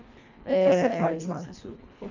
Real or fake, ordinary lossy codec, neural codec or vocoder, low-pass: fake; none; codec, 24 kHz, 1.5 kbps, HILCodec; 7.2 kHz